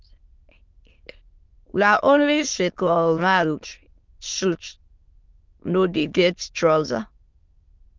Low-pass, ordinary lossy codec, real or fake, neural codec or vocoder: 7.2 kHz; Opus, 24 kbps; fake; autoencoder, 22.05 kHz, a latent of 192 numbers a frame, VITS, trained on many speakers